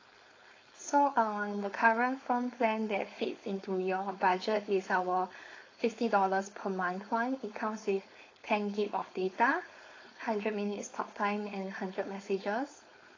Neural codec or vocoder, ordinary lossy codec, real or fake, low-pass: codec, 16 kHz, 4.8 kbps, FACodec; AAC, 32 kbps; fake; 7.2 kHz